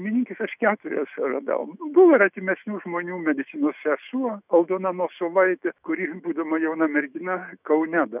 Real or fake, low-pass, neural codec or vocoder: fake; 3.6 kHz; autoencoder, 48 kHz, 128 numbers a frame, DAC-VAE, trained on Japanese speech